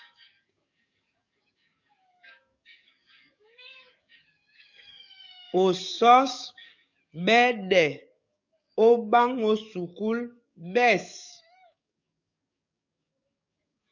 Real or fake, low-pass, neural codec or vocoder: fake; 7.2 kHz; codec, 44.1 kHz, 7.8 kbps, DAC